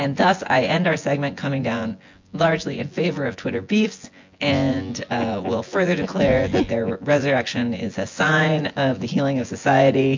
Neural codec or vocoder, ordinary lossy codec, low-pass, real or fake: vocoder, 24 kHz, 100 mel bands, Vocos; MP3, 48 kbps; 7.2 kHz; fake